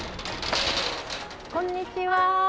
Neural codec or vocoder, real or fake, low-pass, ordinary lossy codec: none; real; none; none